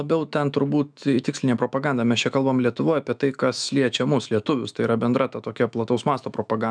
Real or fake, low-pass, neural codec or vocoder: fake; 9.9 kHz; autoencoder, 48 kHz, 128 numbers a frame, DAC-VAE, trained on Japanese speech